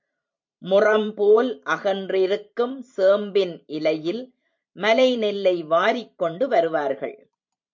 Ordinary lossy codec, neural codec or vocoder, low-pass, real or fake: MP3, 48 kbps; vocoder, 44.1 kHz, 128 mel bands every 512 samples, BigVGAN v2; 7.2 kHz; fake